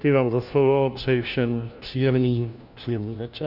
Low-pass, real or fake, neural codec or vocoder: 5.4 kHz; fake; codec, 16 kHz, 1 kbps, FunCodec, trained on LibriTTS, 50 frames a second